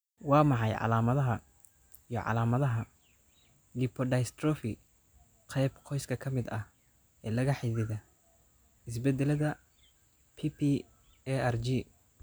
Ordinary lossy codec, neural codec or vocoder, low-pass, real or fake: none; none; none; real